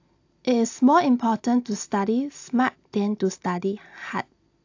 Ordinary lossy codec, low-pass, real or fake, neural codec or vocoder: AAC, 48 kbps; 7.2 kHz; real; none